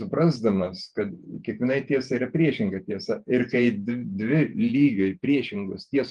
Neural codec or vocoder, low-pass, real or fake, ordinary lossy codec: vocoder, 48 kHz, 128 mel bands, Vocos; 10.8 kHz; fake; Opus, 32 kbps